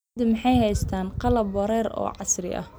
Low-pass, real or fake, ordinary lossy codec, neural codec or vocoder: none; real; none; none